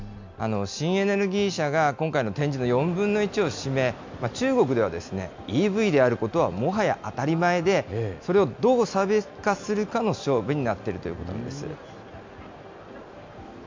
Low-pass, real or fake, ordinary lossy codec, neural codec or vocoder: 7.2 kHz; real; MP3, 64 kbps; none